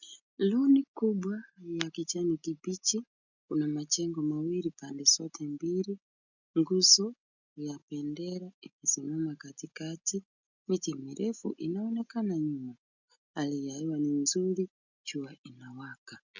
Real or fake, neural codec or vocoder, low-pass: real; none; 7.2 kHz